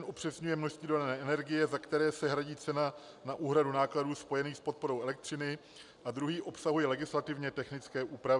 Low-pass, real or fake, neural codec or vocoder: 10.8 kHz; real; none